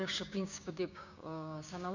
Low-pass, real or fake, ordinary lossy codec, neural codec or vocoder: 7.2 kHz; fake; none; codec, 16 kHz, 6 kbps, DAC